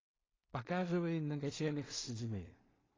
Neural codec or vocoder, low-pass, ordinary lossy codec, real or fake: codec, 16 kHz in and 24 kHz out, 0.4 kbps, LongCat-Audio-Codec, two codebook decoder; 7.2 kHz; AAC, 32 kbps; fake